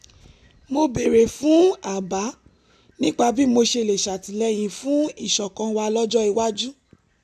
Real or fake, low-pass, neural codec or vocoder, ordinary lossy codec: real; 14.4 kHz; none; none